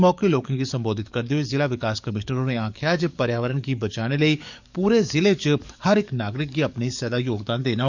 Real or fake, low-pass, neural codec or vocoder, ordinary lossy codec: fake; 7.2 kHz; codec, 44.1 kHz, 7.8 kbps, DAC; none